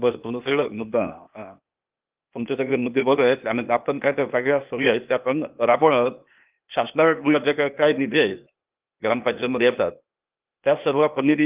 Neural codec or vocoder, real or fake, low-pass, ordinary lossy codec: codec, 16 kHz, 0.8 kbps, ZipCodec; fake; 3.6 kHz; Opus, 32 kbps